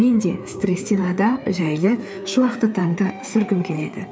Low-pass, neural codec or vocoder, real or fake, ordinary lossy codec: none; codec, 16 kHz, 4 kbps, FreqCodec, larger model; fake; none